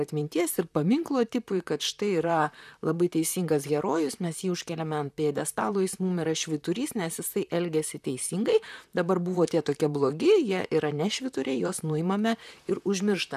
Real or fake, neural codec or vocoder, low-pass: fake; vocoder, 44.1 kHz, 128 mel bands, Pupu-Vocoder; 14.4 kHz